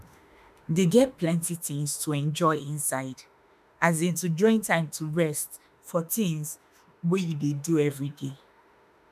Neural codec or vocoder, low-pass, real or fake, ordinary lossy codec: autoencoder, 48 kHz, 32 numbers a frame, DAC-VAE, trained on Japanese speech; 14.4 kHz; fake; none